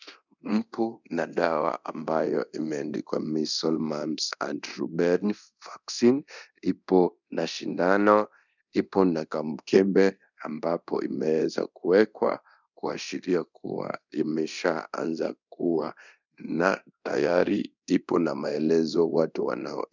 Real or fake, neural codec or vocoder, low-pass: fake; codec, 24 kHz, 0.9 kbps, DualCodec; 7.2 kHz